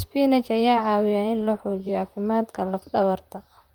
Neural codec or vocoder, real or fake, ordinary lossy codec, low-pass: vocoder, 44.1 kHz, 128 mel bands, Pupu-Vocoder; fake; Opus, 32 kbps; 19.8 kHz